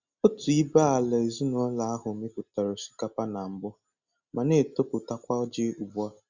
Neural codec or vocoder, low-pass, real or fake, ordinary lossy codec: none; 7.2 kHz; real; Opus, 64 kbps